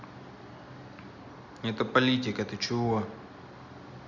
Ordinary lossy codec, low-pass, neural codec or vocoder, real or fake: none; 7.2 kHz; none; real